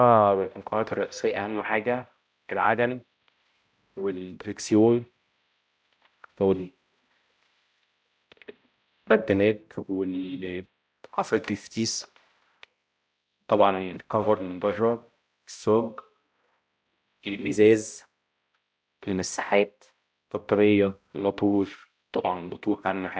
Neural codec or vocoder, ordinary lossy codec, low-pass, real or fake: codec, 16 kHz, 0.5 kbps, X-Codec, HuBERT features, trained on balanced general audio; none; none; fake